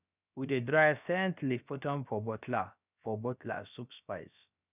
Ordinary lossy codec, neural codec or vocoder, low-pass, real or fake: none; codec, 16 kHz, about 1 kbps, DyCAST, with the encoder's durations; 3.6 kHz; fake